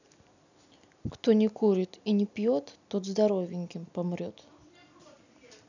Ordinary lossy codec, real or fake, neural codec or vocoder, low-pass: none; real; none; 7.2 kHz